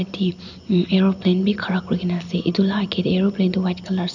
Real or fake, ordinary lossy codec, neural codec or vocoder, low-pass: real; none; none; 7.2 kHz